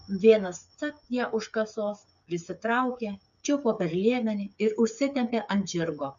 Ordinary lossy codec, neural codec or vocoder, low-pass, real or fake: MP3, 96 kbps; codec, 16 kHz, 8 kbps, FreqCodec, smaller model; 7.2 kHz; fake